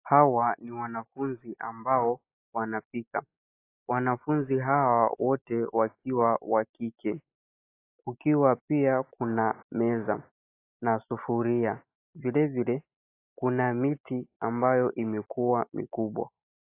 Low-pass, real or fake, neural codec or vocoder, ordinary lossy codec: 3.6 kHz; real; none; AAC, 24 kbps